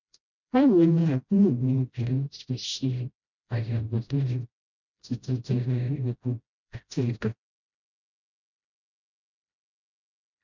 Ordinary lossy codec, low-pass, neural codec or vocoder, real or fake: none; 7.2 kHz; codec, 16 kHz, 0.5 kbps, FreqCodec, smaller model; fake